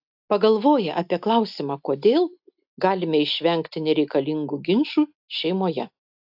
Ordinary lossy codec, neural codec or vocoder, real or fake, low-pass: AAC, 48 kbps; none; real; 5.4 kHz